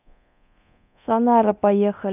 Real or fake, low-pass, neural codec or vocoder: fake; 3.6 kHz; codec, 24 kHz, 0.9 kbps, DualCodec